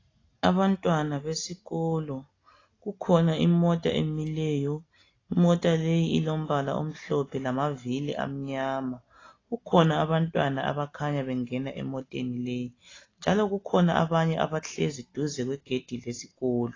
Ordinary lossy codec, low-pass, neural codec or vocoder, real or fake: AAC, 32 kbps; 7.2 kHz; none; real